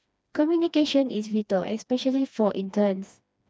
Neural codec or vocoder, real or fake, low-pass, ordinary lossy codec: codec, 16 kHz, 2 kbps, FreqCodec, smaller model; fake; none; none